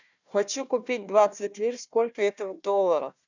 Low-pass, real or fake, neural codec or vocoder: 7.2 kHz; fake; codec, 16 kHz, 1 kbps, FunCodec, trained on Chinese and English, 50 frames a second